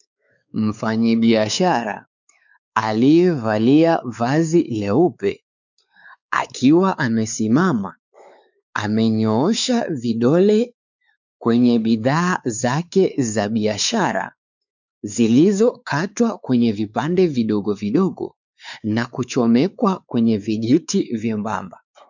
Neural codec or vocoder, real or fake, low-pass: codec, 16 kHz, 4 kbps, X-Codec, WavLM features, trained on Multilingual LibriSpeech; fake; 7.2 kHz